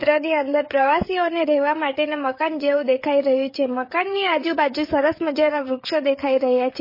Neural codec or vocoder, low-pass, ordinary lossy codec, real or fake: codec, 16 kHz, 16 kbps, FreqCodec, smaller model; 5.4 kHz; MP3, 24 kbps; fake